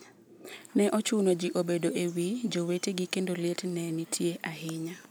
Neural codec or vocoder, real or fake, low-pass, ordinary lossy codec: none; real; none; none